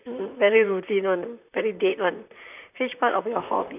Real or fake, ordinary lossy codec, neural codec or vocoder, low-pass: fake; none; vocoder, 44.1 kHz, 128 mel bands, Pupu-Vocoder; 3.6 kHz